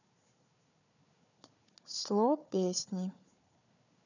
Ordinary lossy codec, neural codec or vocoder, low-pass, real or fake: none; codec, 16 kHz, 4 kbps, FunCodec, trained on Chinese and English, 50 frames a second; 7.2 kHz; fake